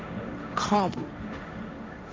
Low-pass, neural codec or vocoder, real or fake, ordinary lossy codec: none; codec, 16 kHz, 1.1 kbps, Voila-Tokenizer; fake; none